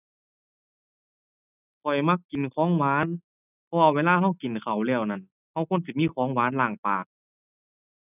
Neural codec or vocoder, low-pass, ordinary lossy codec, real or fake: none; 3.6 kHz; none; real